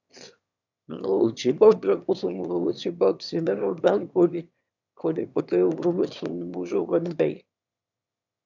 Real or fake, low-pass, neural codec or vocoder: fake; 7.2 kHz; autoencoder, 22.05 kHz, a latent of 192 numbers a frame, VITS, trained on one speaker